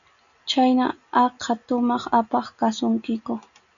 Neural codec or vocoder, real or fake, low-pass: none; real; 7.2 kHz